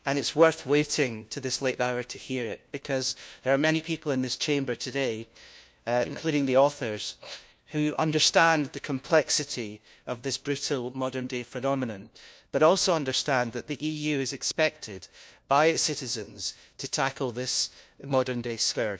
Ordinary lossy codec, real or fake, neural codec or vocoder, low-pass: none; fake; codec, 16 kHz, 1 kbps, FunCodec, trained on LibriTTS, 50 frames a second; none